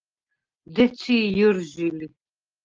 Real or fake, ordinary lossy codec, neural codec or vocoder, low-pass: real; Opus, 16 kbps; none; 7.2 kHz